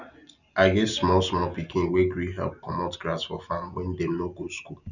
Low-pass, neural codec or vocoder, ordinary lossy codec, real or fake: 7.2 kHz; none; none; real